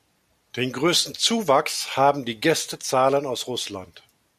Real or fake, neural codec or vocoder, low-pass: real; none; 14.4 kHz